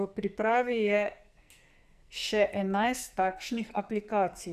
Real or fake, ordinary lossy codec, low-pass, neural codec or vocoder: fake; none; 14.4 kHz; codec, 44.1 kHz, 2.6 kbps, SNAC